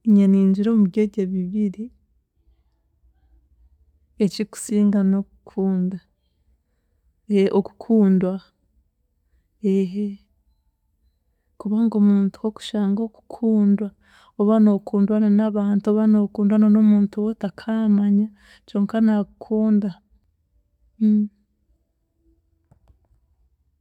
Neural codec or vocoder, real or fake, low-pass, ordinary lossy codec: none; real; 19.8 kHz; none